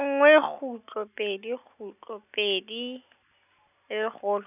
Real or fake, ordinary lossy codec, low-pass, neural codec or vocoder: real; none; 3.6 kHz; none